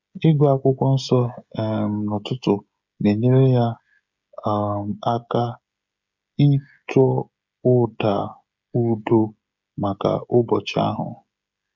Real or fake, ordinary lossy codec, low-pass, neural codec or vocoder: fake; none; 7.2 kHz; codec, 16 kHz, 16 kbps, FreqCodec, smaller model